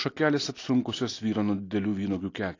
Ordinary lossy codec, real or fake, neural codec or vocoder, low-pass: AAC, 32 kbps; real; none; 7.2 kHz